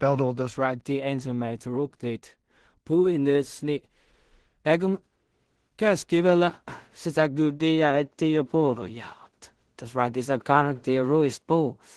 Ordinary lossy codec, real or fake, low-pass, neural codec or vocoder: Opus, 16 kbps; fake; 10.8 kHz; codec, 16 kHz in and 24 kHz out, 0.4 kbps, LongCat-Audio-Codec, two codebook decoder